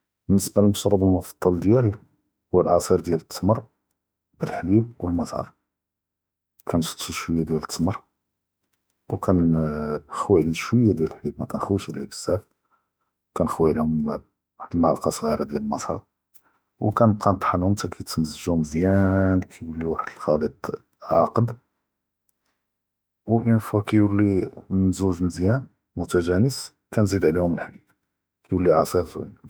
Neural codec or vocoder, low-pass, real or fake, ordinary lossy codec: autoencoder, 48 kHz, 32 numbers a frame, DAC-VAE, trained on Japanese speech; none; fake; none